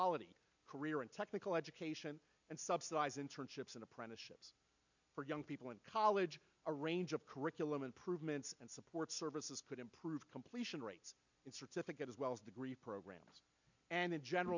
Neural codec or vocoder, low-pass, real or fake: none; 7.2 kHz; real